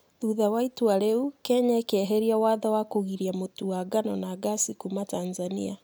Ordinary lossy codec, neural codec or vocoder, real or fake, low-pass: none; none; real; none